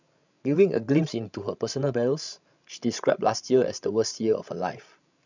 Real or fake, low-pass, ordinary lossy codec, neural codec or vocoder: fake; 7.2 kHz; none; codec, 16 kHz, 16 kbps, FreqCodec, larger model